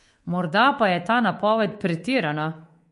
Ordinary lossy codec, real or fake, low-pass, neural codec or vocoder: MP3, 48 kbps; fake; 10.8 kHz; codec, 24 kHz, 3.1 kbps, DualCodec